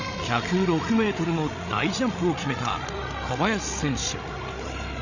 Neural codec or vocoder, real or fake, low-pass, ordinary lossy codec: codec, 16 kHz, 16 kbps, FreqCodec, larger model; fake; 7.2 kHz; none